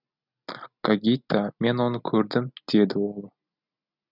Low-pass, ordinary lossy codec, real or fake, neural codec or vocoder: 5.4 kHz; none; real; none